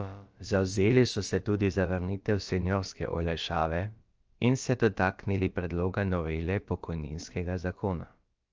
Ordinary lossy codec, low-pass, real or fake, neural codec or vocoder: Opus, 24 kbps; 7.2 kHz; fake; codec, 16 kHz, about 1 kbps, DyCAST, with the encoder's durations